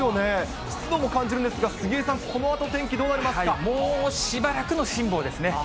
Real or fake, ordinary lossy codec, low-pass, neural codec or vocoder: real; none; none; none